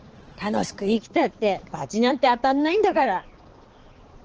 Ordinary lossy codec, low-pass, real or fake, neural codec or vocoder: Opus, 16 kbps; 7.2 kHz; fake; codec, 16 kHz, 4 kbps, X-Codec, HuBERT features, trained on balanced general audio